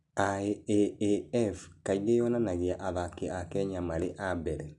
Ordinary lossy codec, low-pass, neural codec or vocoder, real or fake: none; 10.8 kHz; none; real